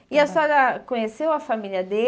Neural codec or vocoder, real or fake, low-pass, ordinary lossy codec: none; real; none; none